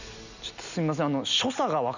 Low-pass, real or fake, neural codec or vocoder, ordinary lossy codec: 7.2 kHz; real; none; none